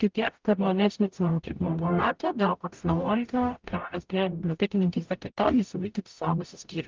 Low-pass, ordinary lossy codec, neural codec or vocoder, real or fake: 7.2 kHz; Opus, 32 kbps; codec, 44.1 kHz, 0.9 kbps, DAC; fake